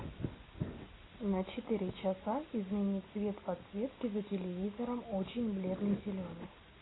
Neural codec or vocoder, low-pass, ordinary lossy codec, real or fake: none; 7.2 kHz; AAC, 16 kbps; real